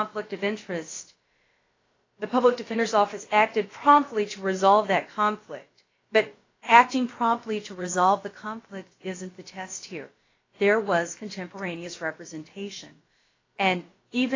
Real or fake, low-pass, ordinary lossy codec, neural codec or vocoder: fake; 7.2 kHz; AAC, 32 kbps; codec, 16 kHz, about 1 kbps, DyCAST, with the encoder's durations